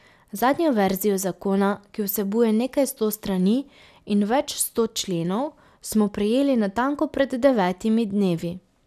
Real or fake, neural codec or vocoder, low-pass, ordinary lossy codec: real; none; 14.4 kHz; none